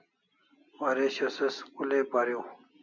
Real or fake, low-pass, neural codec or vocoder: real; 7.2 kHz; none